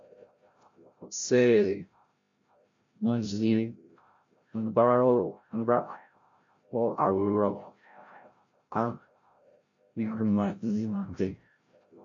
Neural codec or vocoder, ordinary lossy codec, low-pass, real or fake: codec, 16 kHz, 0.5 kbps, FreqCodec, larger model; MP3, 48 kbps; 7.2 kHz; fake